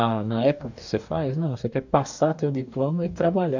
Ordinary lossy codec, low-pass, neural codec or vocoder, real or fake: none; 7.2 kHz; codec, 44.1 kHz, 2.6 kbps, DAC; fake